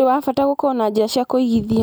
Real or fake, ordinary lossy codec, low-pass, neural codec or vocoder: real; none; none; none